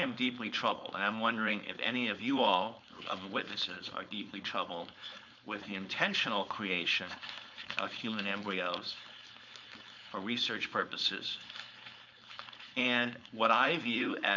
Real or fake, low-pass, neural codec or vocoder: fake; 7.2 kHz; codec, 16 kHz, 4.8 kbps, FACodec